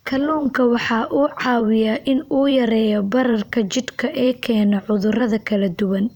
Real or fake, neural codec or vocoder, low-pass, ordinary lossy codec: fake; vocoder, 44.1 kHz, 128 mel bands every 512 samples, BigVGAN v2; 19.8 kHz; none